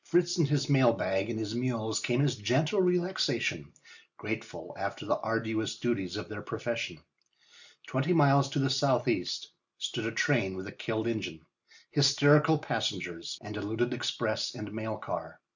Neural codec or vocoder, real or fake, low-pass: none; real; 7.2 kHz